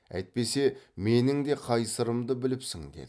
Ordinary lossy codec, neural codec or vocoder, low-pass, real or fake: none; none; none; real